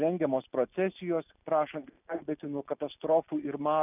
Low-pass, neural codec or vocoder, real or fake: 3.6 kHz; none; real